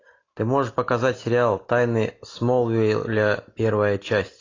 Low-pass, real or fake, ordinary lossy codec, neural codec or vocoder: 7.2 kHz; real; AAC, 32 kbps; none